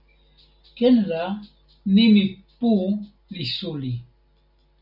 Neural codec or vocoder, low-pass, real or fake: none; 5.4 kHz; real